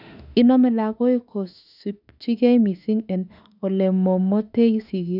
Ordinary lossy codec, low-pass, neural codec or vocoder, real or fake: none; 5.4 kHz; autoencoder, 48 kHz, 32 numbers a frame, DAC-VAE, trained on Japanese speech; fake